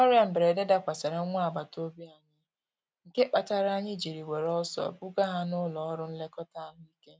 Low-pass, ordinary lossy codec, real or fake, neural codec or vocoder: none; none; real; none